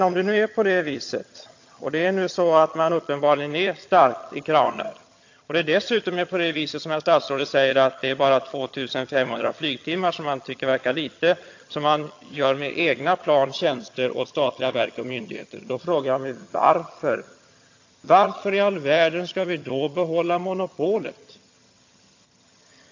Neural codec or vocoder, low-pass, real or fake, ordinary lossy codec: vocoder, 22.05 kHz, 80 mel bands, HiFi-GAN; 7.2 kHz; fake; AAC, 48 kbps